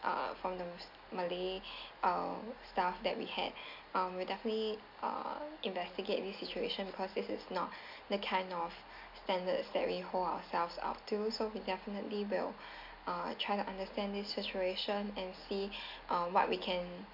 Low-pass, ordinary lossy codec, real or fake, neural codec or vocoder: 5.4 kHz; MP3, 48 kbps; real; none